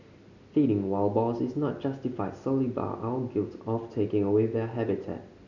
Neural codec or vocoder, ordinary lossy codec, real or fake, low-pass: none; none; real; 7.2 kHz